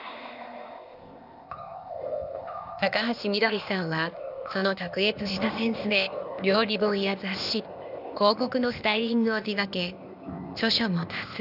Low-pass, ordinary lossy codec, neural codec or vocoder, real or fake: 5.4 kHz; none; codec, 16 kHz, 0.8 kbps, ZipCodec; fake